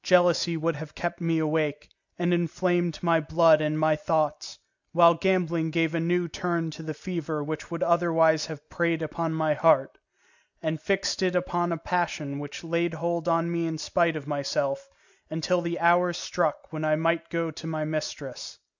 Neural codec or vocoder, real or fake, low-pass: none; real; 7.2 kHz